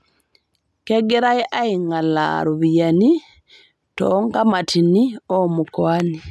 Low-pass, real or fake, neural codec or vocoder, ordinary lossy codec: none; real; none; none